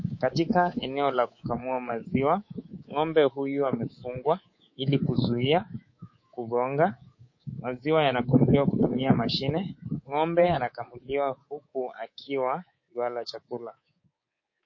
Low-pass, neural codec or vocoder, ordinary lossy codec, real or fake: 7.2 kHz; codec, 24 kHz, 3.1 kbps, DualCodec; MP3, 32 kbps; fake